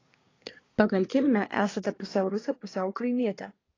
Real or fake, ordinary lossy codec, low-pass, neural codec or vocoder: fake; AAC, 32 kbps; 7.2 kHz; codec, 24 kHz, 1 kbps, SNAC